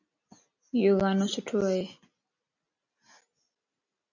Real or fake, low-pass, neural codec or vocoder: real; 7.2 kHz; none